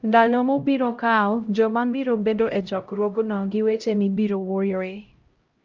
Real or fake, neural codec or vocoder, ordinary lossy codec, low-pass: fake; codec, 16 kHz, 0.5 kbps, X-Codec, HuBERT features, trained on LibriSpeech; Opus, 24 kbps; 7.2 kHz